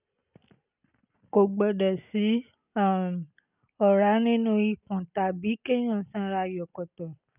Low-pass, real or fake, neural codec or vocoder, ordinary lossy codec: 3.6 kHz; real; none; none